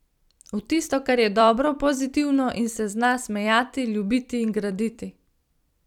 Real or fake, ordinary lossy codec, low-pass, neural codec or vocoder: fake; none; 19.8 kHz; vocoder, 44.1 kHz, 128 mel bands every 256 samples, BigVGAN v2